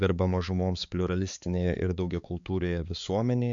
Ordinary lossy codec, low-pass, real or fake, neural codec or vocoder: MP3, 64 kbps; 7.2 kHz; fake; codec, 16 kHz, 4 kbps, X-Codec, HuBERT features, trained on balanced general audio